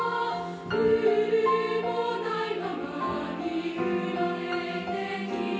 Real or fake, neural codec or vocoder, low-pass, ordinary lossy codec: real; none; none; none